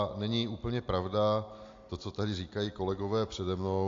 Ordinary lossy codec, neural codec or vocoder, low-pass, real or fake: AAC, 48 kbps; none; 7.2 kHz; real